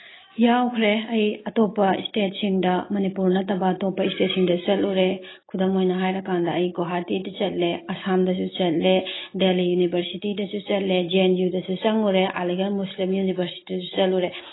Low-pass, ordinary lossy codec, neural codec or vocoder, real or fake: 7.2 kHz; AAC, 16 kbps; none; real